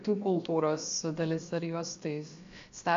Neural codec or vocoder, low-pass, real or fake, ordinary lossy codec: codec, 16 kHz, about 1 kbps, DyCAST, with the encoder's durations; 7.2 kHz; fake; AAC, 48 kbps